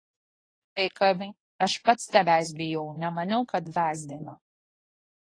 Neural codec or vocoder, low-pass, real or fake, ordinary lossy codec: codec, 24 kHz, 0.9 kbps, WavTokenizer, medium speech release version 1; 9.9 kHz; fake; AAC, 32 kbps